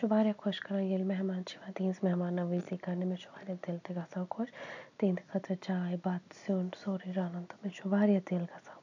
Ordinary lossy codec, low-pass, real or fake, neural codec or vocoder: none; 7.2 kHz; real; none